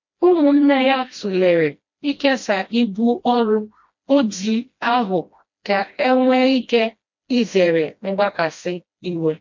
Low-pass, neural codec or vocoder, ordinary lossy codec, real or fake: 7.2 kHz; codec, 16 kHz, 1 kbps, FreqCodec, smaller model; MP3, 48 kbps; fake